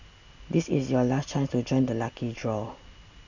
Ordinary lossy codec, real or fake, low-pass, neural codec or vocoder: none; real; 7.2 kHz; none